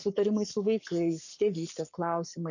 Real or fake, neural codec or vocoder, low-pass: fake; codec, 44.1 kHz, 7.8 kbps, Pupu-Codec; 7.2 kHz